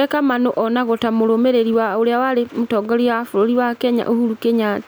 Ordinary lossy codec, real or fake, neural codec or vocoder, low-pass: none; real; none; none